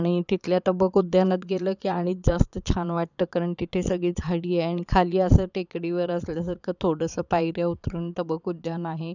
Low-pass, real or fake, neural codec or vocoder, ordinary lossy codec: 7.2 kHz; fake; vocoder, 22.05 kHz, 80 mel bands, Vocos; none